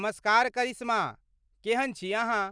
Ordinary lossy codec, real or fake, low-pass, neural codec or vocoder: none; real; 9.9 kHz; none